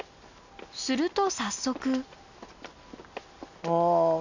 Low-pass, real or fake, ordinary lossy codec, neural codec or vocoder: 7.2 kHz; real; none; none